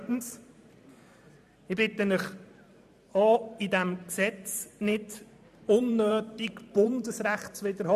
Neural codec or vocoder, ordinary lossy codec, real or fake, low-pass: vocoder, 44.1 kHz, 128 mel bands every 512 samples, BigVGAN v2; none; fake; 14.4 kHz